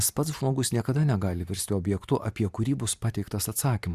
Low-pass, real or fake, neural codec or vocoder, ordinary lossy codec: 14.4 kHz; fake; vocoder, 44.1 kHz, 128 mel bands every 512 samples, BigVGAN v2; Opus, 64 kbps